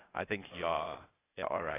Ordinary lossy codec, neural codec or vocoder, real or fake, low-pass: AAC, 16 kbps; codec, 16 kHz, 0.8 kbps, ZipCodec; fake; 3.6 kHz